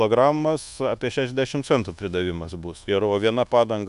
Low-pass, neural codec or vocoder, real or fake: 10.8 kHz; codec, 24 kHz, 1.2 kbps, DualCodec; fake